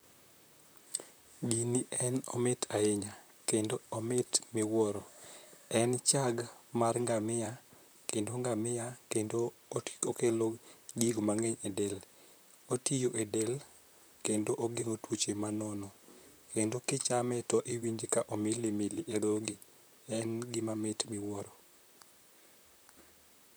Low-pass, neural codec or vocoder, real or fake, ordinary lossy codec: none; vocoder, 44.1 kHz, 128 mel bands, Pupu-Vocoder; fake; none